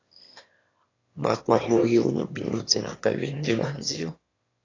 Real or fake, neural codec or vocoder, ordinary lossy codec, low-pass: fake; autoencoder, 22.05 kHz, a latent of 192 numbers a frame, VITS, trained on one speaker; AAC, 32 kbps; 7.2 kHz